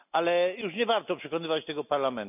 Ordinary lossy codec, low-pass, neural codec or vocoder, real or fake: none; 3.6 kHz; none; real